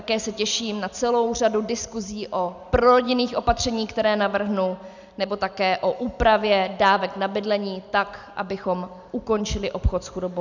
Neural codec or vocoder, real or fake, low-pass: none; real; 7.2 kHz